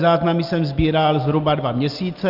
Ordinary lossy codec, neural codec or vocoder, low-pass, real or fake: Opus, 32 kbps; none; 5.4 kHz; real